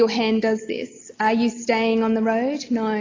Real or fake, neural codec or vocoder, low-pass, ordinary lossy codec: real; none; 7.2 kHz; AAC, 32 kbps